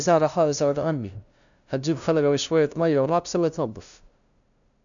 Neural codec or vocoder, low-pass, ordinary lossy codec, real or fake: codec, 16 kHz, 0.5 kbps, FunCodec, trained on LibriTTS, 25 frames a second; 7.2 kHz; none; fake